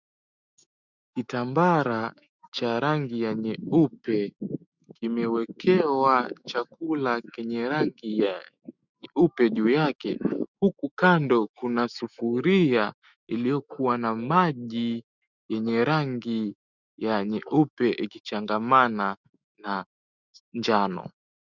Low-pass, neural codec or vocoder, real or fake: 7.2 kHz; autoencoder, 48 kHz, 128 numbers a frame, DAC-VAE, trained on Japanese speech; fake